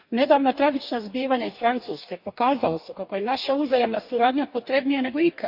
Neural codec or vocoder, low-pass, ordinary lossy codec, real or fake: codec, 44.1 kHz, 2.6 kbps, DAC; 5.4 kHz; none; fake